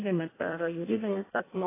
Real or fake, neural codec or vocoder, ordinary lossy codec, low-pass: fake; codec, 16 kHz in and 24 kHz out, 0.6 kbps, FireRedTTS-2 codec; AAC, 16 kbps; 3.6 kHz